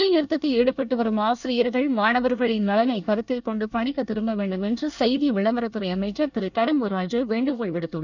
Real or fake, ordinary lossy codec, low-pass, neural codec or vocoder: fake; none; 7.2 kHz; codec, 24 kHz, 1 kbps, SNAC